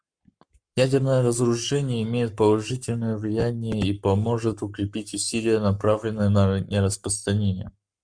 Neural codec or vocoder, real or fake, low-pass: codec, 44.1 kHz, 7.8 kbps, Pupu-Codec; fake; 9.9 kHz